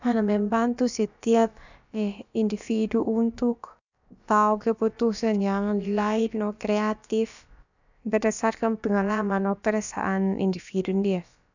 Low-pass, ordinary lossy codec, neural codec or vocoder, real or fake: 7.2 kHz; none; codec, 16 kHz, about 1 kbps, DyCAST, with the encoder's durations; fake